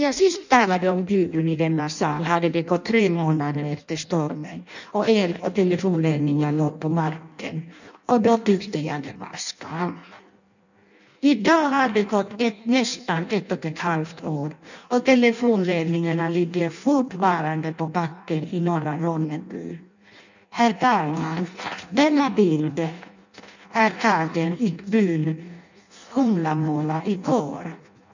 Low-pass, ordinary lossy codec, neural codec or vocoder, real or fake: 7.2 kHz; none; codec, 16 kHz in and 24 kHz out, 0.6 kbps, FireRedTTS-2 codec; fake